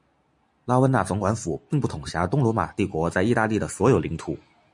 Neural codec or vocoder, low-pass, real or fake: none; 10.8 kHz; real